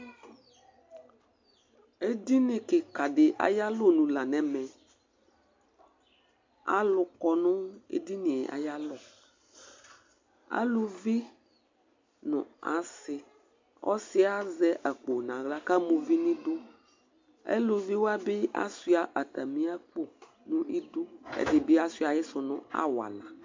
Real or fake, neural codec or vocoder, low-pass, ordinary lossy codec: real; none; 7.2 kHz; MP3, 48 kbps